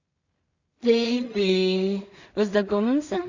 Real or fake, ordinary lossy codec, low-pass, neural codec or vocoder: fake; Opus, 64 kbps; 7.2 kHz; codec, 16 kHz in and 24 kHz out, 0.4 kbps, LongCat-Audio-Codec, two codebook decoder